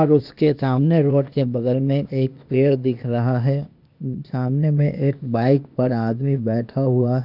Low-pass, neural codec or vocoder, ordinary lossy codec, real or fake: 5.4 kHz; codec, 16 kHz, 0.8 kbps, ZipCodec; none; fake